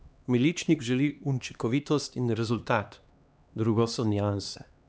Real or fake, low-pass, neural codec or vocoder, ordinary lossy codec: fake; none; codec, 16 kHz, 2 kbps, X-Codec, HuBERT features, trained on LibriSpeech; none